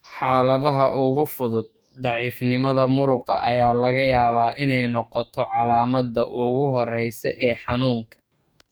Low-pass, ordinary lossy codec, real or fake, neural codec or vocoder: none; none; fake; codec, 44.1 kHz, 2.6 kbps, DAC